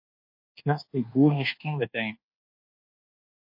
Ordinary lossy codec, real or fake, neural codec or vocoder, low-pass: MP3, 32 kbps; fake; codec, 24 kHz, 1.2 kbps, DualCodec; 5.4 kHz